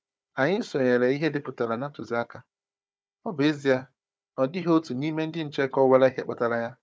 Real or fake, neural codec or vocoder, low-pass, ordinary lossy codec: fake; codec, 16 kHz, 4 kbps, FunCodec, trained on Chinese and English, 50 frames a second; none; none